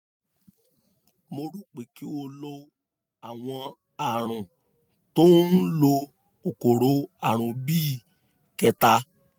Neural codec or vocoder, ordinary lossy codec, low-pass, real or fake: none; none; none; real